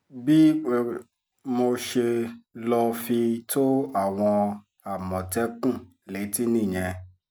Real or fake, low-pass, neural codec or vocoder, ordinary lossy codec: real; none; none; none